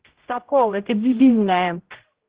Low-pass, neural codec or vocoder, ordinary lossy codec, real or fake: 3.6 kHz; codec, 16 kHz, 0.5 kbps, X-Codec, HuBERT features, trained on general audio; Opus, 16 kbps; fake